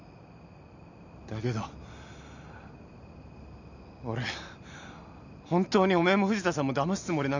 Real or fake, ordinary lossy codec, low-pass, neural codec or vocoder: real; none; 7.2 kHz; none